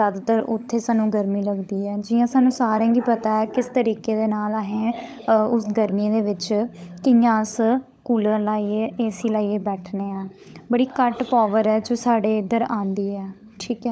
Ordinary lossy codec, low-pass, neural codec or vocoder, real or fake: none; none; codec, 16 kHz, 16 kbps, FunCodec, trained on LibriTTS, 50 frames a second; fake